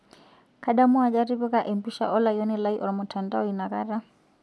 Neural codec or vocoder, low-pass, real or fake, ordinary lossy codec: none; none; real; none